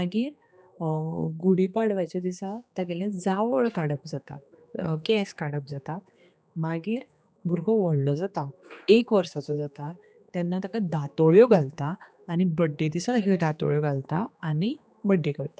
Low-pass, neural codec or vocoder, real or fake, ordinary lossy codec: none; codec, 16 kHz, 2 kbps, X-Codec, HuBERT features, trained on general audio; fake; none